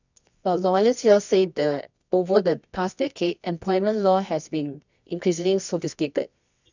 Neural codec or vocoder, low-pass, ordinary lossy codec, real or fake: codec, 24 kHz, 0.9 kbps, WavTokenizer, medium music audio release; 7.2 kHz; none; fake